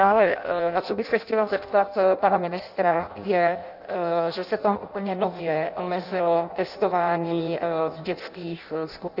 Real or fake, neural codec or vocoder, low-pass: fake; codec, 16 kHz in and 24 kHz out, 0.6 kbps, FireRedTTS-2 codec; 5.4 kHz